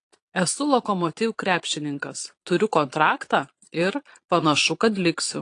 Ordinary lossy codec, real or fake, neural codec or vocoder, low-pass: AAC, 48 kbps; fake; vocoder, 22.05 kHz, 80 mel bands, Vocos; 9.9 kHz